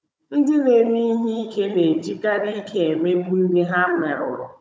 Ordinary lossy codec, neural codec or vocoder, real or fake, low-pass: none; codec, 16 kHz, 16 kbps, FunCodec, trained on Chinese and English, 50 frames a second; fake; none